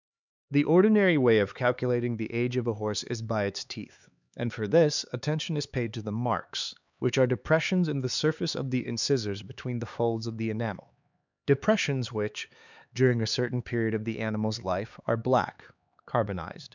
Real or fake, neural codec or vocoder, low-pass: fake; codec, 16 kHz, 4 kbps, X-Codec, HuBERT features, trained on LibriSpeech; 7.2 kHz